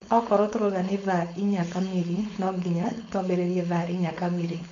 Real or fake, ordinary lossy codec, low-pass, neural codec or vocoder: fake; MP3, 48 kbps; 7.2 kHz; codec, 16 kHz, 4.8 kbps, FACodec